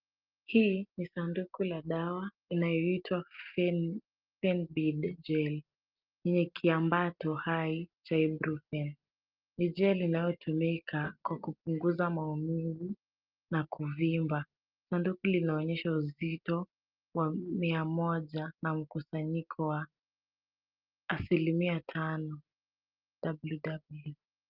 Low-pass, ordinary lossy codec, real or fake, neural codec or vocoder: 5.4 kHz; Opus, 24 kbps; real; none